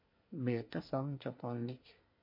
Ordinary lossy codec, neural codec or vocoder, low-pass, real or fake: MP3, 32 kbps; codec, 24 kHz, 1 kbps, SNAC; 5.4 kHz; fake